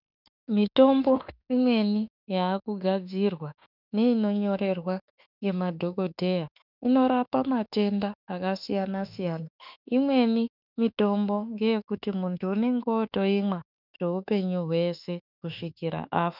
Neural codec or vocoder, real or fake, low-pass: autoencoder, 48 kHz, 32 numbers a frame, DAC-VAE, trained on Japanese speech; fake; 5.4 kHz